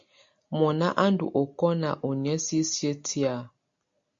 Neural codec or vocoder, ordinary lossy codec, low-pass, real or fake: none; MP3, 48 kbps; 7.2 kHz; real